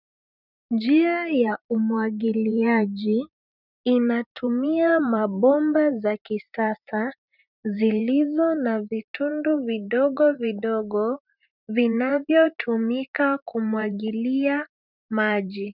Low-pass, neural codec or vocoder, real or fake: 5.4 kHz; vocoder, 44.1 kHz, 128 mel bands every 512 samples, BigVGAN v2; fake